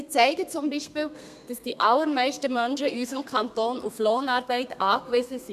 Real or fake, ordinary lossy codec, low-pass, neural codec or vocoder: fake; none; 14.4 kHz; codec, 32 kHz, 1.9 kbps, SNAC